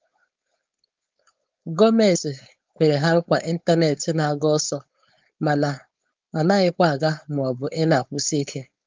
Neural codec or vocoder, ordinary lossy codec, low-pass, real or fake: codec, 16 kHz, 4.8 kbps, FACodec; Opus, 24 kbps; 7.2 kHz; fake